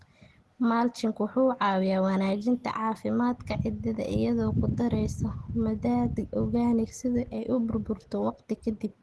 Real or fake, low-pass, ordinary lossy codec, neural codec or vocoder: real; 10.8 kHz; Opus, 16 kbps; none